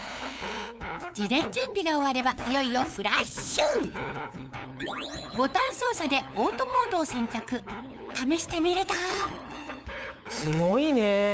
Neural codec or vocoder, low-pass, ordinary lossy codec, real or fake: codec, 16 kHz, 8 kbps, FunCodec, trained on LibriTTS, 25 frames a second; none; none; fake